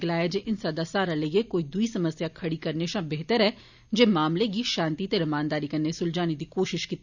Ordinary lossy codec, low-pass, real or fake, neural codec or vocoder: none; 7.2 kHz; real; none